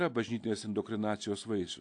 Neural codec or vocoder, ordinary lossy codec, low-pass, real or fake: none; AAC, 48 kbps; 9.9 kHz; real